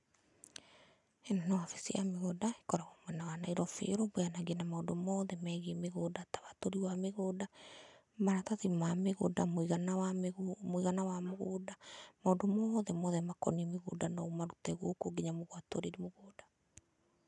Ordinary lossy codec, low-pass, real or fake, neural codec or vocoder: none; 10.8 kHz; real; none